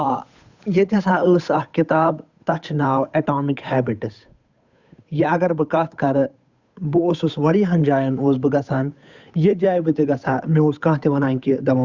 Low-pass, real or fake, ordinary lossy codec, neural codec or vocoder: 7.2 kHz; fake; Opus, 64 kbps; codec, 16 kHz, 8 kbps, FunCodec, trained on Chinese and English, 25 frames a second